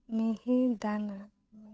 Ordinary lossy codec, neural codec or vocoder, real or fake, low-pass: none; codec, 16 kHz, 8 kbps, FunCodec, trained on Chinese and English, 25 frames a second; fake; none